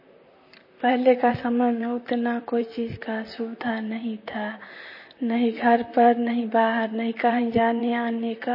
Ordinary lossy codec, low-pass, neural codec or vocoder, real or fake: MP3, 24 kbps; 5.4 kHz; vocoder, 44.1 kHz, 128 mel bands, Pupu-Vocoder; fake